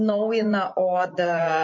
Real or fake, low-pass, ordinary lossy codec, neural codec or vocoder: fake; 7.2 kHz; MP3, 32 kbps; vocoder, 24 kHz, 100 mel bands, Vocos